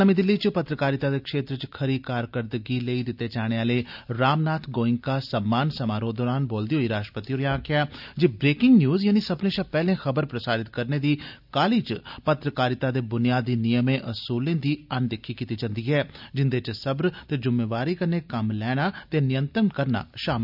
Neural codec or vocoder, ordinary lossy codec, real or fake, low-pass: none; none; real; 5.4 kHz